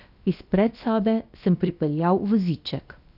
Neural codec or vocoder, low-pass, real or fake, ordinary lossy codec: codec, 16 kHz, about 1 kbps, DyCAST, with the encoder's durations; 5.4 kHz; fake; none